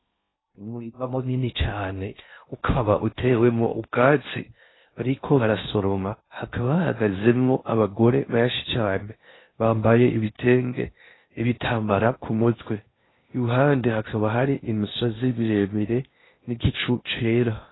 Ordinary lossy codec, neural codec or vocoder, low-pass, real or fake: AAC, 16 kbps; codec, 16 kHz in and 24 kHz out, 0.6 kbps, FocalCodec, streaming, 4096 codes; 7.2 kHz; fake